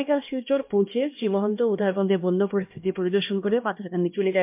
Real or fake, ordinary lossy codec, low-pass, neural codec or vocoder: fake; MP3, 32 kbps; 3.6 kHz; codec, 16 kHz, 1 kbps, X-Codec, HuBERT features, trained on LibriSpeech